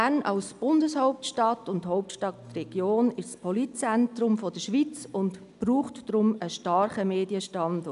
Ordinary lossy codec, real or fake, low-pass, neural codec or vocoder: none; real; 10.8 kHz; none